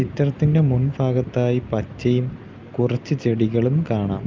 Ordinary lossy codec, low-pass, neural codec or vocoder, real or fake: Opus, 32 kbps; 7.2 kHz; none; real